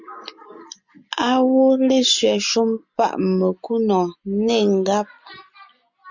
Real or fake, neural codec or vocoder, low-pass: real; none; 7.2 kHz